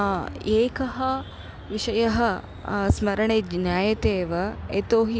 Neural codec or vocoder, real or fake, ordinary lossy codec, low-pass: none; real; none; none